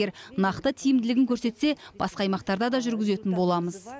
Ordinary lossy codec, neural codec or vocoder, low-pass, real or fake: none; none; none; real